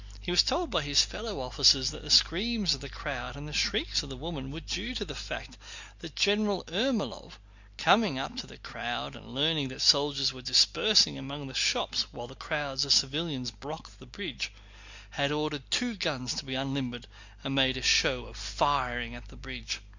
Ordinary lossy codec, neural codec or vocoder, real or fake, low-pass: Opus, 64 kbps; none; real; 7.2 kHz